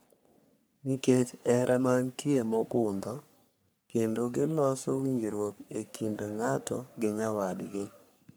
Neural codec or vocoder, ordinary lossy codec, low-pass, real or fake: codec, 44.1 kHz, 3.4 kbps, Pupu-Codec; none; none; fake